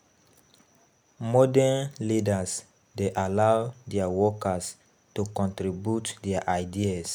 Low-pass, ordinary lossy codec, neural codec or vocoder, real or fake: none; none; none; real